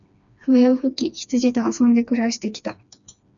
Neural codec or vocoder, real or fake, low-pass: codec, 16 kHz, 2 kbps, FreqCodec, smaller model; fake; 7.2 kHz